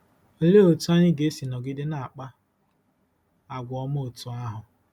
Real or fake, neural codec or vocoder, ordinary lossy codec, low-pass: real; none; none; 19.8 kHz